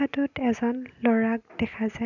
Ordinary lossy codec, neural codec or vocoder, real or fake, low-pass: none; none; real; 7.2 kHz